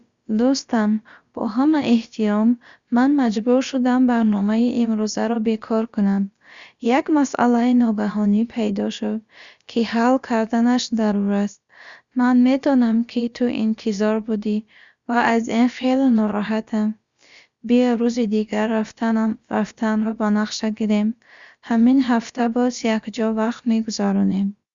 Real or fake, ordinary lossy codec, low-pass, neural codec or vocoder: fake; Opus, 64 kbps; 7.2 kHz; codec, 16 kHz, about 1 kbps, DyCAST, with the encoder's durations